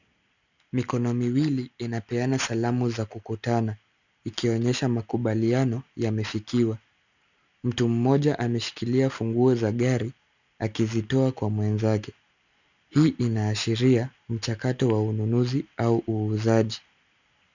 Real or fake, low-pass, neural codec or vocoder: real; 7.2 kHz; none